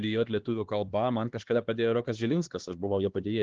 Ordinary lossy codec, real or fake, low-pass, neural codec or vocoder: Opus, 16 kbps; fake; 7.2 kHz; codec, 16 kHz, 2 kbps, X-Codec, HuBERT features, trained on LibriSpeech